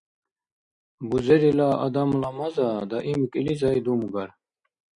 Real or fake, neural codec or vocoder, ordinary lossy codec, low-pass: real; none; Opus, 64 kbps; 9.9 kHz